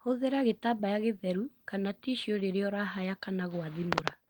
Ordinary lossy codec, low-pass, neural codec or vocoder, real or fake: Opus, 24 kbps; 19.8 kHz; none; real